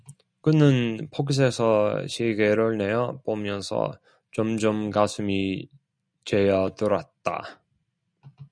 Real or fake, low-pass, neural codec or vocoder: real; 9.9 kHz; none